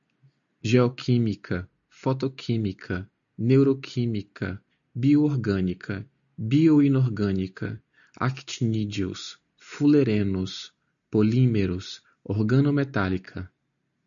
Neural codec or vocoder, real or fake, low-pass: none; real; 7.2 kHz